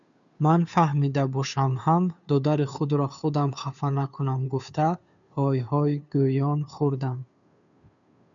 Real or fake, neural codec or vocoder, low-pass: fake; codec, 16 kHz, 2 kbps, FunCodec, trained on Chinese and English, 25 frames a second; 7.2 kHz